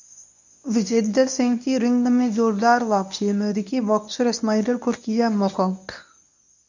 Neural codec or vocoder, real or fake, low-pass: codec, 24 kHz, 0.9 kbps, WavTokenizer, medium speech release version 1; fake; 7.2 kHz